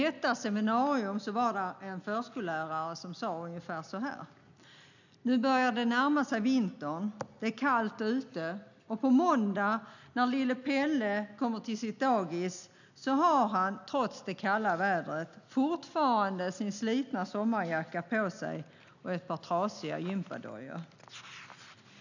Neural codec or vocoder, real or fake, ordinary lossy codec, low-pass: none; real; none; 7.2 kHz